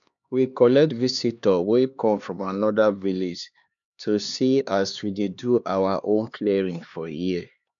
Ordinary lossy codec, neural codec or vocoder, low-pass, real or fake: none; codec, 16 kHz, 2 kbps, X-Codec, HuBERT features, trained on LibriSpeech; 7.2 kHz; fake